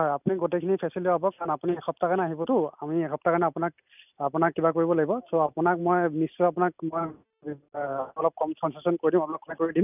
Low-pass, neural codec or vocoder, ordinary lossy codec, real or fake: 3.6 kHz; none; none; real